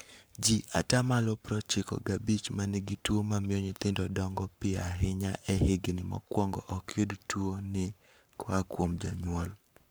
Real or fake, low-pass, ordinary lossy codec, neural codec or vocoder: fake; none; none; codec, 44.1 kHz, 7.8 kbps, Pupu-Codec